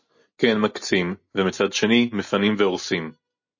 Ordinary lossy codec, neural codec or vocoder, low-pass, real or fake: MP3, 32 kbps; none; 7.2 kHz; real